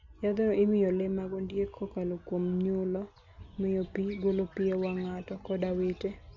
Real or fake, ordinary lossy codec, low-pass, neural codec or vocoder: real; none; 7.2 kHz; none